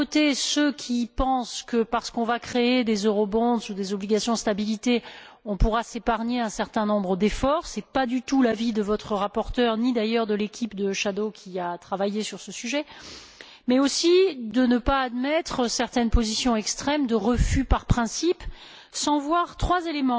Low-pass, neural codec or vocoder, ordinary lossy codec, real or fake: none; none; none; real